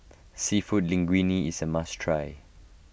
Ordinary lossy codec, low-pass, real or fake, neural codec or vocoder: none; none; real; none